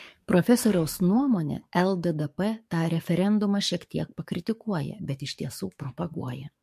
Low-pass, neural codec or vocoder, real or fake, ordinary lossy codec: 14.4 kHz; codec, 44.1 kHz, 7.8 kbps, DAC; fake; MP3, 64 kbps